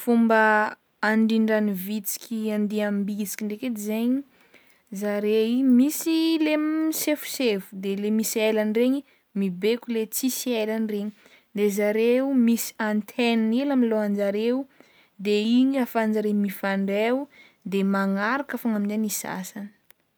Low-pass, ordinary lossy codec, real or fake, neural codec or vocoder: none; none; real; none